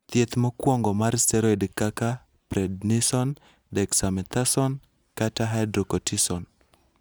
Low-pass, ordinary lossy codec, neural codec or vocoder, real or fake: none; none; none; real